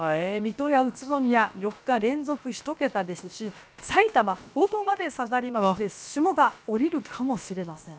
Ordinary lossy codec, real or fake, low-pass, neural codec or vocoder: none; fake; none; codec, 16 kHz, about 1 kbps, DyCAST, with the encoder's durations